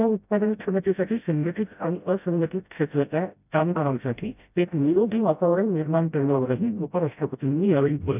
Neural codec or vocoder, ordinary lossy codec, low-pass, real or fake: codec, 16 kHz, 0.5 kbps, FreqCodec, smaller model; none; 3.6 kHz; fake